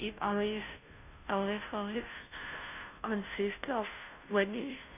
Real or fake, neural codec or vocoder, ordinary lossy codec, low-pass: fake; codec, 16 kHz, 0.5 kbps, FunCodec, trained on Chinese and English, 25 frames a second; none; 3.6 kHz